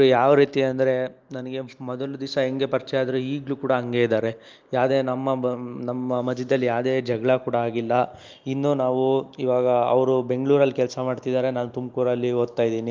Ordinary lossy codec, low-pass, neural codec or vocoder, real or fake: Opus, 32 kbps; 7.2 kHz; none; real